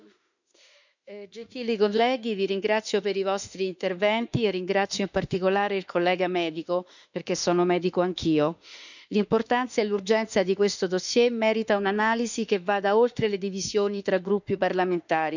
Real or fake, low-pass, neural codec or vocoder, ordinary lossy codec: fake; 7.2 kHz; autoencoder, 48 kHz, 32 numbers a frame, DAC-VAE, trained on Japanese speech; none